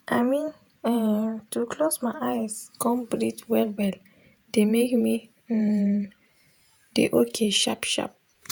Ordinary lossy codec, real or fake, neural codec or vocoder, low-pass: none; fake; vocoder, 48 kHz, 128 mel bands, Vocos; none